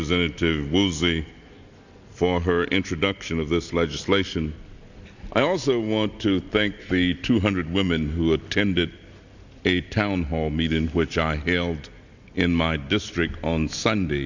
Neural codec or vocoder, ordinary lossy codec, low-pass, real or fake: none; Opus, 64 kbps; 7.2 kHz; real